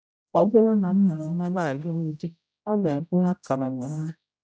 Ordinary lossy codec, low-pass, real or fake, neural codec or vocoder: none; none; fake; codec, 16 kHz, 0.5 kbps, X-Codec, HuBERT features, trained on general audio